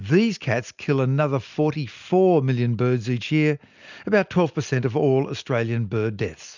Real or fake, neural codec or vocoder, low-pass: real; none; 7.2 kHz